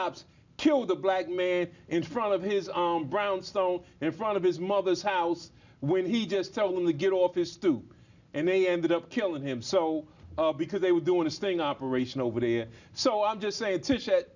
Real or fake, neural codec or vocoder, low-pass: real; none; 7.2 kHz